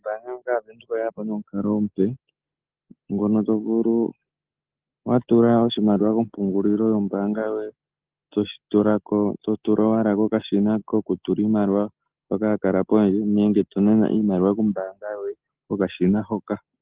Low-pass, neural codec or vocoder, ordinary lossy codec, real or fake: 3.6 kHz; none; Opus, 16 kbps; real